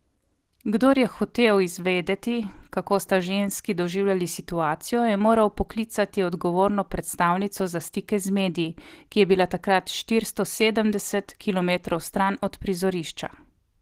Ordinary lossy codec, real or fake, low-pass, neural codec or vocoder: Opus, 16 kbps; real; 14.4 kHz; none